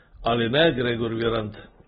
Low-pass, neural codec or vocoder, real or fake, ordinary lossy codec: 9.9 kHz; none; real; AAC, 16 kbps